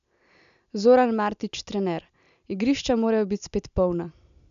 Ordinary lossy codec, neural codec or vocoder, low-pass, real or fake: MP3, 96 kbps; none; 7.2 kHz; real